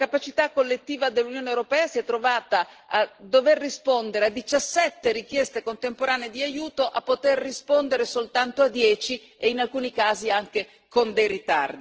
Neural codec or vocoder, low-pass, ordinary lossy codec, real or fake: none; 7.2 kHz; Opus, 16 kbps; real